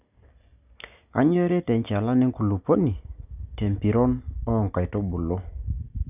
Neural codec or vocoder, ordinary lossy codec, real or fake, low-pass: none; none; real; 3.6 kHz